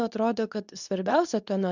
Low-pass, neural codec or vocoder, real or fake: 7.2 kHz; codec, 24 kHz, 0.9 kbps, WavTokenizer, medium speech release version 2; fake